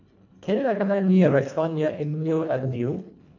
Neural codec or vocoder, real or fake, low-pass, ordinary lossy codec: codec, 24 kHz, 1.5 kbps, HILCodec; fake; 7.2 kHz; none